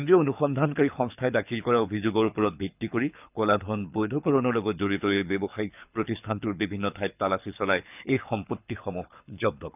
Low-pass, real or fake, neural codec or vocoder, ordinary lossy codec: 3.6 kHz; fake; codec, 24 kHz, 6 kbps, HILCodec; none